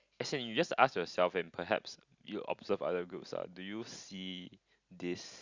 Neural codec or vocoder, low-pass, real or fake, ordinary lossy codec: none; 7.2 kHz; real; Opus, 64 kbps